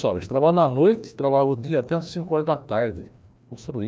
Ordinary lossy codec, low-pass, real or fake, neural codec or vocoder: none; none; fake; codec, 16 kHz, 1 kbps, FreqCodec, larger model